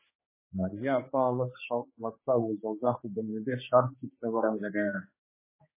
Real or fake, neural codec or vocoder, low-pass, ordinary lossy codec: fake; codec, 16 kHz, 2 kbps, X-Codec, HuBERT features, trained on general audio; 3.6 kHz; MP3, 16 kbps